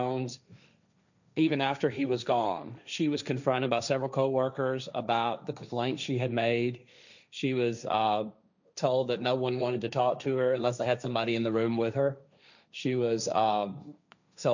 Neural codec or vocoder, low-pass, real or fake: codec, 16 kHz, 1.1 kbps, Voila-Tokenizer; 7.2 kHz; fake